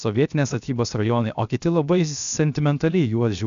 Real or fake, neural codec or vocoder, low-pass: fake; codec, 16 kHz, 0.7 kbps, FocalCodec; 7.2 kHz